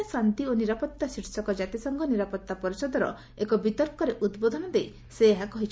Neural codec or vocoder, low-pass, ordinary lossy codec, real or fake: none; none; none; real